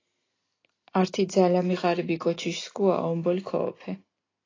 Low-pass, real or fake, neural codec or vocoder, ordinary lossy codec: 7.2 kHz; real; none; AAC, 32 kbps